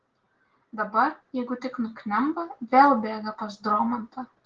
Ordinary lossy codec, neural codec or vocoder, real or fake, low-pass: Opus, 16 kbps; none; real; 7.2 kHz